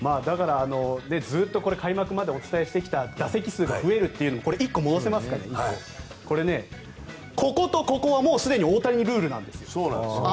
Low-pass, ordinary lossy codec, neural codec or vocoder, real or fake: none; none; none; real